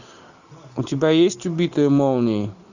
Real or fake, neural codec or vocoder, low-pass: real; none; 7.2 kHz